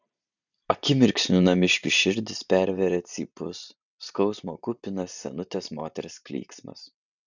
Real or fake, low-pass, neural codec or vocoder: fake; 7.2 kHz; vocoder, 44.1 kHz, 128 mel bands every 512 samples, BigVGAN v2